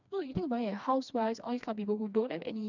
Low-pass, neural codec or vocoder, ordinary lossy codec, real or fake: 7.2 kHz; codec, 16 kHz, 2 kbps, FreqCodec, smaller model; none; fake